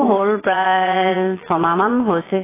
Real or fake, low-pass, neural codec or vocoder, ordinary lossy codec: fake; 3.6 kHz; vocoder, 22.05 kHz, 80 mel bands, Vocos; AAC, 16 kbps